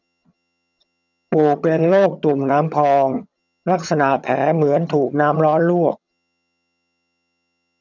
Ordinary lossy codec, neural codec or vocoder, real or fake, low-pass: none; vocoder, 22.05 kHz, 80 mel bands, HiFi-GAN; fake; 7.2 kHz